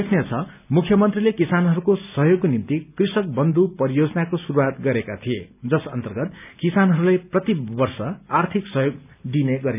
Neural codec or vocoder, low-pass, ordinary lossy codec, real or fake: none; 3.6 kHz; none; real